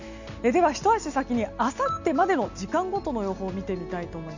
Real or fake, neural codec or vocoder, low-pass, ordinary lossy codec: real; none; 7.2 kHz; none